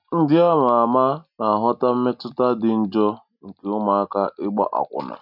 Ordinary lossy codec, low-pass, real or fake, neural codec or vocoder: none; 5.4 kHz; real; none